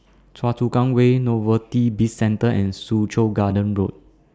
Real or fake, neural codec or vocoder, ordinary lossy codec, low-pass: real; none; none; none